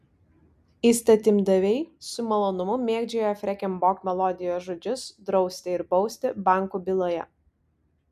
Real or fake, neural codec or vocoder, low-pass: real; none; 14.4 kHz